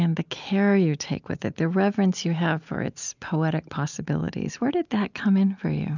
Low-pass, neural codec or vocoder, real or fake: 7.2 kHz; none; real